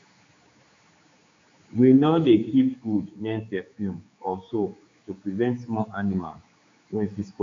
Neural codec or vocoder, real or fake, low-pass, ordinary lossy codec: codec, 16 kHz, 4 kbps, X-Codec, HuBERT features, trained on general audio; fake; 7.2 kHz; AAC, 48 kbps